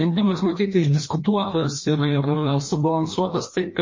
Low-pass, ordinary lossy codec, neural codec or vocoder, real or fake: 7.2 kHz; MP3, 32 kbps; codec, 16 kHz, 1 kbps, FreqCodec, larger model; fake